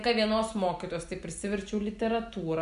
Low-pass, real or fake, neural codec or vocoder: 10.8 kHz; real; none